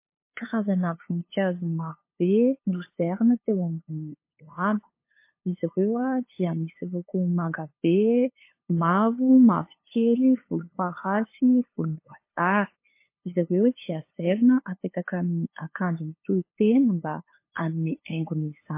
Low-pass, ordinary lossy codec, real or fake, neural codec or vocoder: 3.6 kHz; MP3, 24 kbps; fake; codec, 16 kHz, 2 kbps, FunCodec, trained on LibriTTS, 25 frames a second